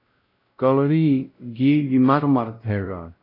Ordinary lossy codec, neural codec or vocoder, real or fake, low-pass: AAC, 32 kbps; codec, 16 kHz, 0.5 kbps, X-Codec, WavLM features, trained on Multilingual LibriSpeech; fake; 5.4 kHz